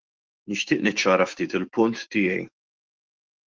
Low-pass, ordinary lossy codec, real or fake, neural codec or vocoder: 7.2 kHz; Opus, 16 kbps; fake; autoencoder, 48 kHz, 128 numbers a frame, DAC-VAE, trained on Japanese speech